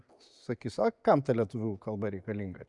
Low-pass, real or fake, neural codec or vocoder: 10.8 kHz; real; none